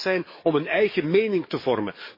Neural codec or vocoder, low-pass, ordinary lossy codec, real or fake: codec, 44.1 kHz, 7.8 kbps, DAC; 5.4 kHz; MP3, 24 kbps; fake